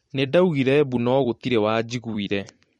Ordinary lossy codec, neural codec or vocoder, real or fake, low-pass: MP3, 48 kbps; vocoder, 44.1 kHz, 128 mel bands every 256 samples, BigVGAN v2; fake; 19.8 kHz